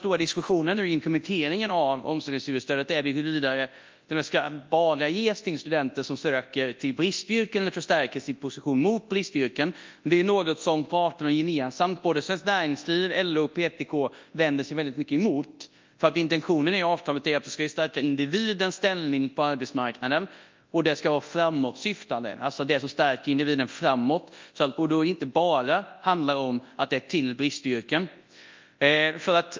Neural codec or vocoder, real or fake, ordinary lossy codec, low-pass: codec, 24 kHz, 0.9 kbps, WavTokenizer, large speech release; fake; Opus, 24 kbps; 7.2 kHz